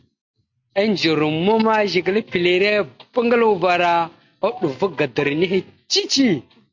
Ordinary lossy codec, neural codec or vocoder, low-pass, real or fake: MP3, 48 kbps; none; 7.2 kHz; real